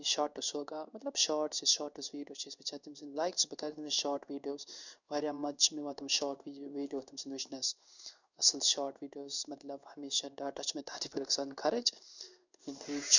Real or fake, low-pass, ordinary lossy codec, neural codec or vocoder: fake; 7.2 kHz; none; codec, 16 kHz in and 24 kHz out, 1 kbps, XY-Tokenizer